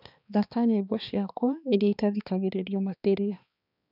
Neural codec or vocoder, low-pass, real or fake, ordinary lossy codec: codec, 16 kHz, 2 kbps, X-Codec, HuBERT features, trained on balanced general audio; 5.4 kHz; fake; none